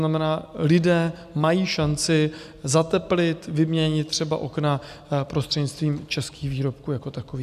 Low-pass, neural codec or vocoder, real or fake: 14.4 kHz; none; real